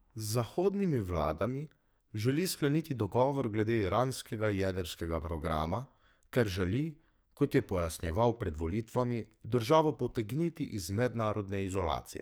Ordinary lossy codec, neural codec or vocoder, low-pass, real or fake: none; codec, 44.1 kHz, 2.6 kbps, SNAC; none; fake